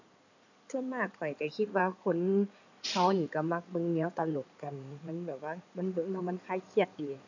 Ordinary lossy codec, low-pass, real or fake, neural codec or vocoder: none; 7.2 kHz; fake; codec, 16 kHz in and 24 kHz out, 2.2 kbps, FireRedTTS-2 codec